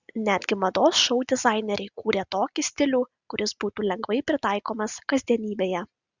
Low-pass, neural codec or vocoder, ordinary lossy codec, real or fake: 7.2 kHz; none; Opus, 64 kbps; real